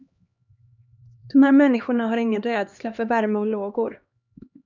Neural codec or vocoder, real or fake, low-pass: codec, 16 kHz, 2 kbps, X-Codec, HuBERT features, trained on LibriSpeech; fake; 7.2 kHz